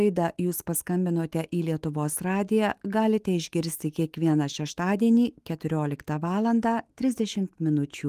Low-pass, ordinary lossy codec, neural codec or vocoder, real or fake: 14.4 kHz; Opus, 24 kbps; autoencoder, 48 kHz, 128 numbers a frame, DAC-VAE, trained on Japanese speech; fake